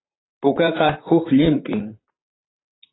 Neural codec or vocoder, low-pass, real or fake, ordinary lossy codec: vocoder, 44.1 kHz, 128 mel bands, Pupu-Vocoder; 7.2 kHz; fake; AAC, 16 kbps